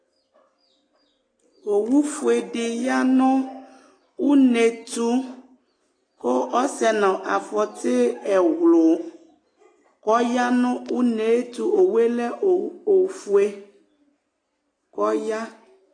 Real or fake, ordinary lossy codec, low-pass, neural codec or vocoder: real; AAC, 32 kbps; 9.9 kHz; none